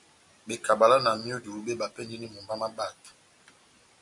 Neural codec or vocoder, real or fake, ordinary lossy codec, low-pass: none; real; AAC, 64 kbps; 10.8 kHz